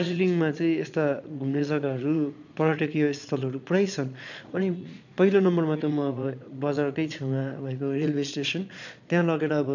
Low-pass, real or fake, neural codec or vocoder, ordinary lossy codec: 7.2 kHz; fake; vocoder, 22.05 kHz, 80 mel bands, Vocos; none